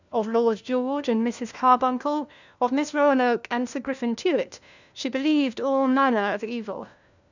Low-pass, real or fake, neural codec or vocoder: 7.2 kHz; fake; codec, 16 kHz, 1 kbps, FunCodec, trained on LibriTTS, 50 frames a second